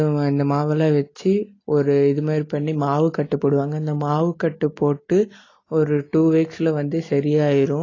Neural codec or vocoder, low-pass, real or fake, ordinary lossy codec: none; 7.2 kHz; real; AAC, 32 kbps